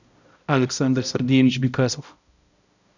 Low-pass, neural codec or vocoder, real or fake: 7.2 kHz; codec, 16 kHz, 0.5 kbps, X-Codec, HuBERT features, trained on balanced general audio; fake